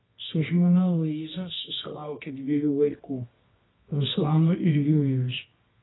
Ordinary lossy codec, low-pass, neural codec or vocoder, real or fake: AAC, 16 kbps; 7.2 kHz; codec, 24 kHz, 0.9 kbps, WavTokenizer, medium music audio release; fake